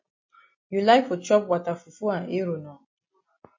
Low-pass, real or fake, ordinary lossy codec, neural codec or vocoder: 7.2 kHz; real; MP3, 32 kbps; none